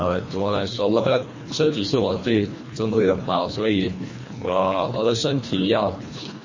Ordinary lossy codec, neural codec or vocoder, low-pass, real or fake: MP3, 32 kbps; codec, 24 kHz, 1.5 kbps, HILCodec; 7.2 kHz; fake